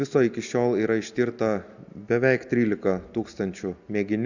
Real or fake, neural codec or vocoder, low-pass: real; none; 7.2 kHz